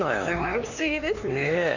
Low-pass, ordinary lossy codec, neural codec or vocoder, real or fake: 7.2 kHz; none; codec, 16 kHz, 4 kbps, X-Codec, HuBERT features, trained on LibriSpeech; fake